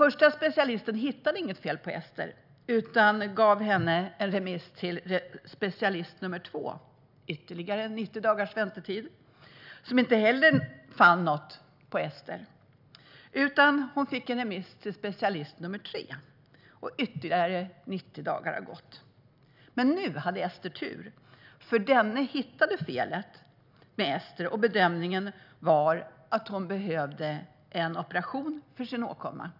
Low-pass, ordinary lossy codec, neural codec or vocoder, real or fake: 5.4 kHz; none; none; real